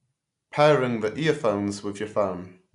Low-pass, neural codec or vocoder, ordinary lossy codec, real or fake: 10.8 kHz; none; none; real